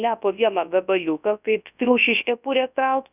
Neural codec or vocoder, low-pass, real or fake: codec, 24 kHz, 0.9 kbps, WavTokenizer, large speech release; 3.6 kHz; fake